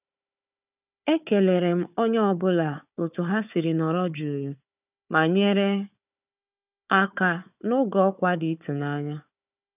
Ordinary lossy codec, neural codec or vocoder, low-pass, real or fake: none; codec, 16 kHz, 4 kbps, FunCodec, trained on Chinese and English, 50 frames a second; 3.6 kHz; fake